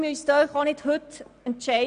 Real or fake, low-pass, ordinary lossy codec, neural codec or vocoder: real; 9.9 kHz; none; none